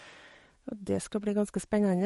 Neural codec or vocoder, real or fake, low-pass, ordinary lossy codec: codec, 44.1 kHz, 7.8 kbps, DAC; fake; 19.8 kHz; MP3, 48 kbps